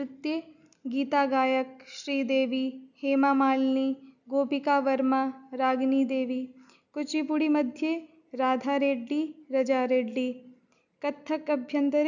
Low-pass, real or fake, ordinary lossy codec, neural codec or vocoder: 7.2 kHz; real; none; none